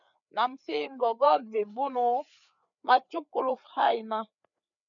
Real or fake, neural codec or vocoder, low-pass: fake; codec, 16 kHz, 4 kbps, FreqCodec, larger model; 7.2 kHz